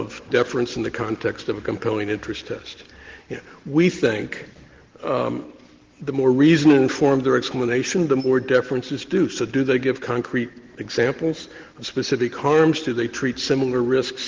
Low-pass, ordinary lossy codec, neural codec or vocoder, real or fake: 7.2 kHz; Opus, 16 kbps; none; real